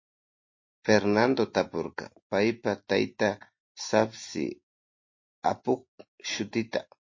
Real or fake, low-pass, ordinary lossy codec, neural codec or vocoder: real; 7.2 kHz; MP3, 32 kbps; none